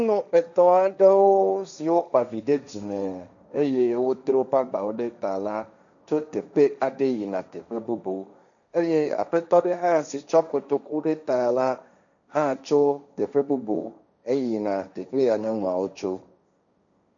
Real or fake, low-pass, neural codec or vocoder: fake; 7.2 kHz; codec, 16 kHz, 1.1 kbps, Voila-Tokenizer